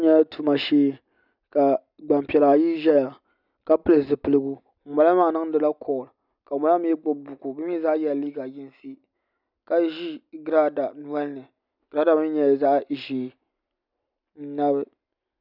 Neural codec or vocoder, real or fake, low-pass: none; real; 5.4 kHz